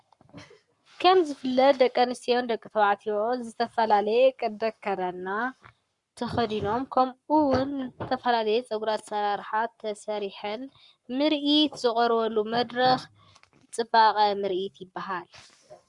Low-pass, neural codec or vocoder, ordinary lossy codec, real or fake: 10.8 kHz; codec, 44.1 kHz, 7.8 kbps, Pupu-Codec; Opus, 64 kbps; fake